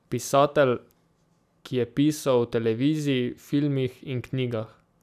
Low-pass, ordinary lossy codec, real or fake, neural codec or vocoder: 14.4 kHz; none; real; none